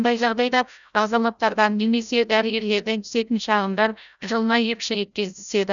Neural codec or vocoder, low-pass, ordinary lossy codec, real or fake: codec, 16 kHz, 0.5 kbps, FreqCodec, larger model; 7.2 kHz; none; fake